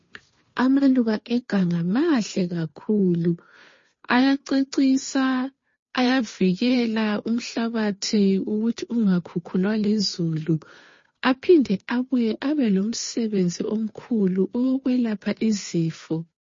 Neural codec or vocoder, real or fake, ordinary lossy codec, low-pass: codec, 16 kHz, 2 kbps, FunCodec, trained on Chinese and English, 25 frames a second; fake; MP3, 32 kbps; 7.2 kHz